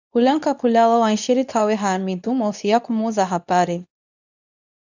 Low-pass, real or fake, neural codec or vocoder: 7.2 kHz; fake; codec, 24 kHz, 0.9 kbps, WavTokenizer, medium speech release version 2